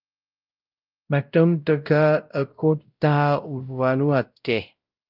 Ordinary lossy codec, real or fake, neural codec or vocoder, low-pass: Opus, 24 kbps; fake; codec, 16 kHz, 0.5 kbps, X-Codec, WavLM features, trained on Multilingual LibriSpeech; 5.4 kHz